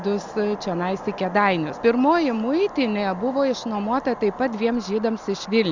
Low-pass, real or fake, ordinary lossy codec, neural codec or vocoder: 7.2 kHz; real; Opus, 64 kbps; none